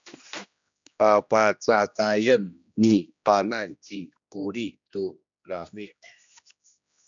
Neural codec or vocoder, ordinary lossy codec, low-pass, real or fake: codec, 16 kHz, 1 kbps, X-Codec, HuBERT features, trained on general audio; MP3, 64 kbps; 7.2 kHz; fake